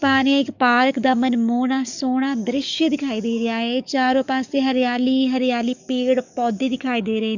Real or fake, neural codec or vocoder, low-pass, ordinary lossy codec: fake; codec, 44.1 kHz, 7.8 kbps, DAC; 7.2 kHz; none